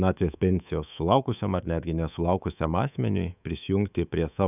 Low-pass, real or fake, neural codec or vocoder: 3.6 kHz; fake; codec, 24 kHz, 3.1 kbps, DualCodec